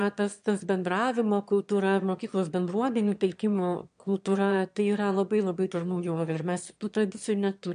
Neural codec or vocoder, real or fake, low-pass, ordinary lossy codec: autoencoder, 22.05 kHz, a latent of 192 numbers a frame, VITS, trained on one speaker; fake; 9.9 kHz; MP3, 64 kbps